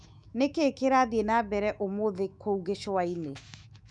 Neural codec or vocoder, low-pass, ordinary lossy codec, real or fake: autoencoder, 48 kHz, 128 numbers a frame, DAC-VAE, trained on Japanese speech; 10.8 kHz; none; fake